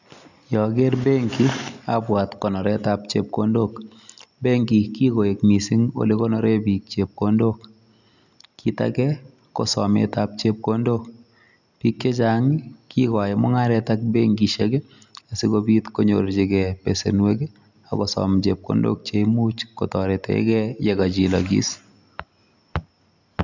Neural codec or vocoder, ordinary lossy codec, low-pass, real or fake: none; none; 7.2 kHz; real